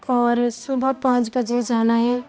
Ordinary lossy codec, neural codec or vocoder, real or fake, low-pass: none; codec, 16 kHz, 1 kbps, X-Codec, HuBERT features, trained on balanced general audio; fake; none